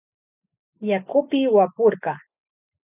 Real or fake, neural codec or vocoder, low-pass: real; none; 3.6 kHz